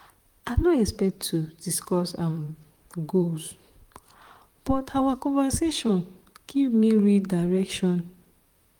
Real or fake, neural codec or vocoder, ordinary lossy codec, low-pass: fake; vocoder, 44.1 kHz, 128 mel bands, Pupu-Vocoder; Opus, 32 kbps; 19.8 kHz